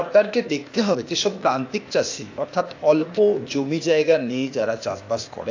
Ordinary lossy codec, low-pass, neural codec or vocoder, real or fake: AAC, 48 kbps; 7.2 kHz; codec, 16 kHz, 0.8 kbps, ZipCodec; fake